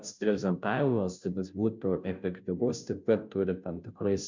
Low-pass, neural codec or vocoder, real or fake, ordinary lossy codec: 7.2 kHz; codec, 16 kHz, 0.5 kbps, FunCodec, trained on Chinese and English, 25 frames a second; fake; MP3, 64 kbps